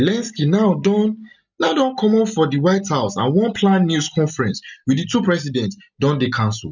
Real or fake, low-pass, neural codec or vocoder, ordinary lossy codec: real; 7.2 kHz; none; none